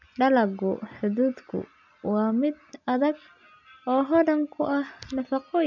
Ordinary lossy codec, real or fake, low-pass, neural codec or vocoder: none; real; 7.2 kHz; none